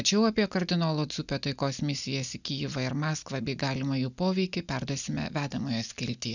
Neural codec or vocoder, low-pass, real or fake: none; 7.2 kHz; real